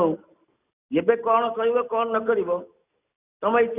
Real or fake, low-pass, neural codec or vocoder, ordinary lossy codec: real; 3.6 kHz; none; none